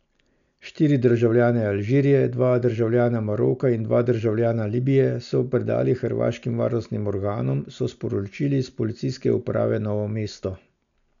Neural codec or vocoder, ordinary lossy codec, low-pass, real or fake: none; none; 7.2 kHz; real